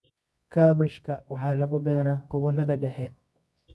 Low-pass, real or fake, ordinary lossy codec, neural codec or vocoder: none; fake; none; codec, 24 kHz, 0.9 kbps, WavTokenizer, medium music audio release